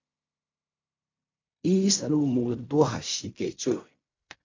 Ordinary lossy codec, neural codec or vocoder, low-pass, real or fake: MP3, 64 kbps; codec, 16 kHz in and 24 kHz out, 0.4 kbps, LongCat-Audio-Codec, fine tuned four codebook decoder; 7.2 kHz; fake